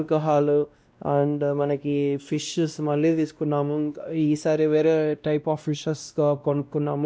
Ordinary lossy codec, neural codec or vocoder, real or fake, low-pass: none; codec, 16 kHz, 1 kbps, X-Codec, WavLM features, trained on Multilingual LibriSpeech; fake; none